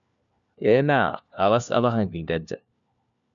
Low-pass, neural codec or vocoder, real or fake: 7.2 kHz; codec, 16 kHz, 1 kbps, FunCodec, trained on LibriTTS, 50 frames a second; fake